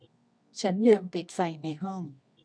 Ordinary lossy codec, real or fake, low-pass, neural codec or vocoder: none; fake; 9.9 kHz; codec, 24 kHz, 0.9 kbps, WavTokenizer, medium music audio release